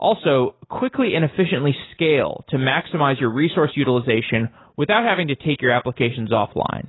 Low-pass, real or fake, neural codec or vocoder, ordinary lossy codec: 7.2 kHz; real; none; AAC, 16 kbps